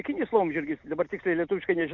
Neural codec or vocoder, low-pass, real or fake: none; 7.2 kHz; real